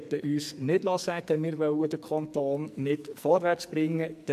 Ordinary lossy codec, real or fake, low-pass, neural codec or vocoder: none; fake; 14.4 kHz; codec, 44.1 kHz, 2.6 kbps, SNAC